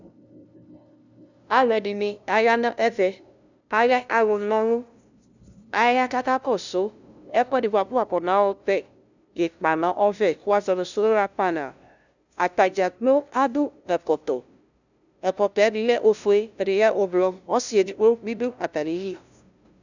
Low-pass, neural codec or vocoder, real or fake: 7.2 kHz; codec, 16 kHz, 0.5 kbps, FunCodec, trained on LibriTTS, 25 frames a second; fake